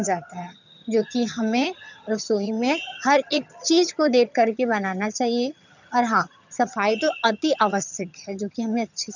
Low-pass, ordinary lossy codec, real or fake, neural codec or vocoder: 7.2 kHz; none; fake; vocoder, 22.05 kHz, 80 mel bands, HiFi-GAN